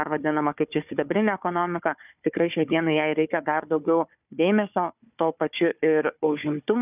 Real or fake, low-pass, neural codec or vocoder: fake; 3.6 kHz; codec, 16 kHz, 4 kbps, FunCodec, trained on Chinese and English, 50 frames a second